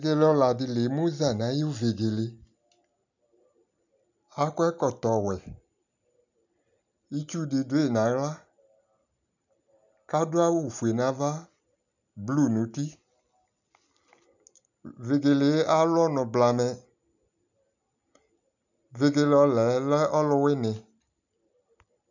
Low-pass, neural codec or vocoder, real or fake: 7.2 kHz; none; real